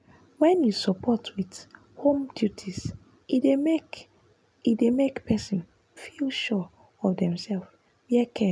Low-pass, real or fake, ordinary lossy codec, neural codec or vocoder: none; real; none; none